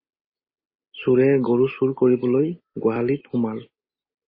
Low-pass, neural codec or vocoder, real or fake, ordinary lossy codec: 5.4 kHz; none; real; MP3, 24 kbps